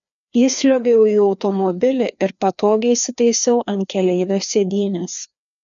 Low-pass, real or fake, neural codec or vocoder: 7.2 kHz; fake; codec, 16 kHz, 2 kbps, FreqCodec, larger model